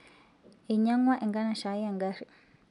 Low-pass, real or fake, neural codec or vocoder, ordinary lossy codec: 10.8 kHz; real; none; none